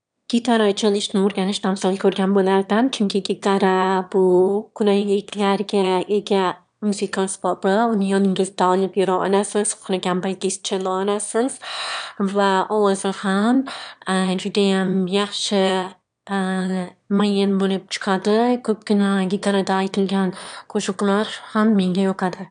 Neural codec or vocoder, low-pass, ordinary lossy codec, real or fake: autoencoder, 22.05 kHz, a latent of 192 numbers a frame, VITS, trained on one speaker; 9.9 kHz; none; fake